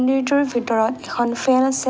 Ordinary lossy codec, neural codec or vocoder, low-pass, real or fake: none; none; none; real